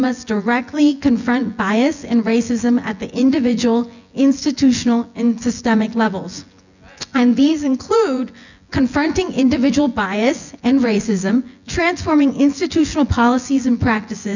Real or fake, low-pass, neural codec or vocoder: fake; 7.2 kHz; vocoder, 24 kHz, 100 mel bands, Vocos